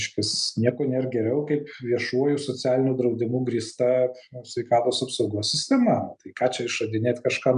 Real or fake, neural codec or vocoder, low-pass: real; none; 10.8 kHz